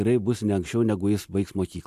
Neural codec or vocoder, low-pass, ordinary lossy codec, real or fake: none; 14.4 kHz; AAC, 96 kbps; real